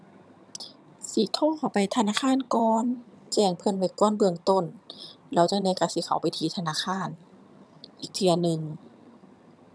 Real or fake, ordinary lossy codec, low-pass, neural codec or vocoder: fake; none; none; vocoder, 22.05 kHz, 80 mel bands, HiFi-GAN